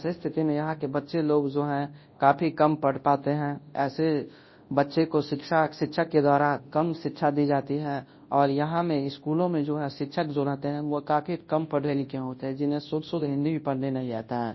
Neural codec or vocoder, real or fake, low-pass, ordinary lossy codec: codec, 24 kHz, 0.9 kbps, WavTokenizer, large speech release; fake; 7.2 kHz; MP3, 24 kbps